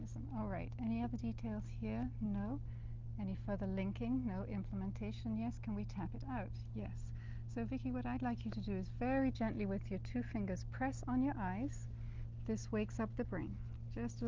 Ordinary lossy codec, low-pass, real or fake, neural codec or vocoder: Opus, 24 kbps; 7.2 kHz; real; none